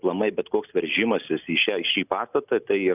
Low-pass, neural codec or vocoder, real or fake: 3.6 kHz; none; real